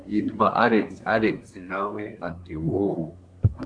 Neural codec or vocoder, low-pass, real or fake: codec, 24 kHz, 1 kbps, SNAC; 9.9 kHz; fake